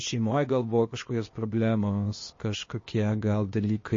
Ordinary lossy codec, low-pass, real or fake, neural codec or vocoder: MP3, 32 kbps; 7.2 kHz; fake; codec, 16 kHz, 0.8 kbps, ZipCodec